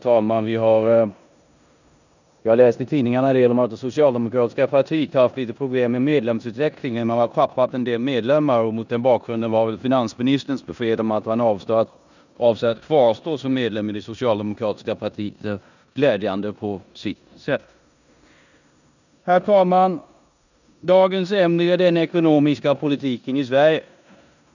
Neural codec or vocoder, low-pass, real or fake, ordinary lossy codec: codec, 16 kHz in and 24 kHz out, 0.9 kbps, LongCat-Audio-Codec, four codebook decoder; 7.2 kHz; fake; none